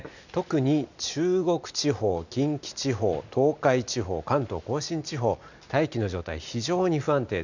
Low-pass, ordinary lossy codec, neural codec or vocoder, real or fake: 7.2 kHz; none; none; real